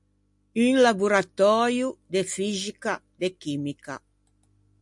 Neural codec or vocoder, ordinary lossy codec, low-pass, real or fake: none; MP3, 64 kbps; 10.8 kHz; real